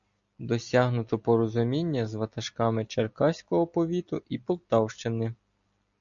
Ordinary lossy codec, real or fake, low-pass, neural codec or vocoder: MP3, 96 kbps; real; 7.2 kHz; none